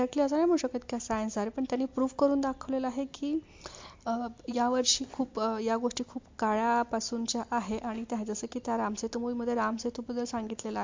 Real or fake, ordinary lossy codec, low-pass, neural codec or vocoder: real; MP3, 48 kbps; 7.2 kHz; none